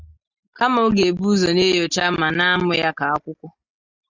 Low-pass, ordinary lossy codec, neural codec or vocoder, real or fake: 7.2 kHz; Opus, 64 kbps; none; real